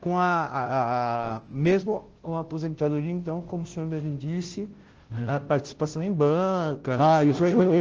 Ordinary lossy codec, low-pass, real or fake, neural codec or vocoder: Opus, 16 kbps; 7.2 kHz; fake; codec, 16 kHz, 0.5 kbps, FunCodec, trained on Chinese and English, 25 frames a second